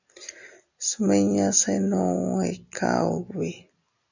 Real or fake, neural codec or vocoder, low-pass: real; none; 7.2 kHz